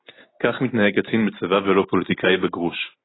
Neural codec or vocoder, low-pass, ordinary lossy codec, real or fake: none; 7.2 kHz; AAC, 16 kbps; real